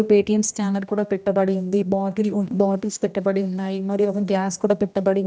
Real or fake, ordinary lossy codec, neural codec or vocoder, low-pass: fake; none; codec, 16 kHz, 1 kbps, X-Codec, HuBERT features, trained on general audio; none